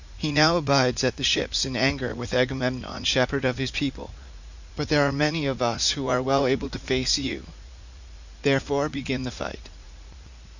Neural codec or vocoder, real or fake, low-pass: vocoder, 44.1 kHz, 80 mel bands, Vocos; fake; 7.2 kHz